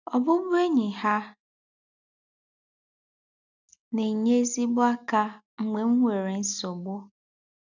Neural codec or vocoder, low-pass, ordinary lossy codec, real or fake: none; 7.2 kHz; none; real